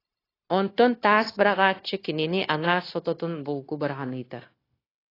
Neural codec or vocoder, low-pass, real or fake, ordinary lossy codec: codec, 16 kHz, 0.9 kbps, LongCat-Audio-Codec; 5.4 kHz; fake; AAC, 24 kbps